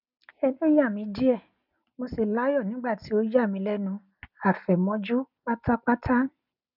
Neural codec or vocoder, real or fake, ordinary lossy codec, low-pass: none; real; none; 5.4 kHz